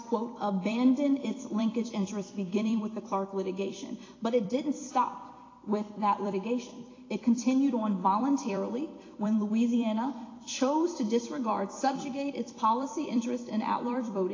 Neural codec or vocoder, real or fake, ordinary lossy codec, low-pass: vocoder, 44.1 kHz, 128 mel bands every 256 samples, BigVGAN v2; fake; AAC, 32 kbps; 7.2 kHz